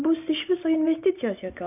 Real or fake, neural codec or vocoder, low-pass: real; none; 3.6 kHz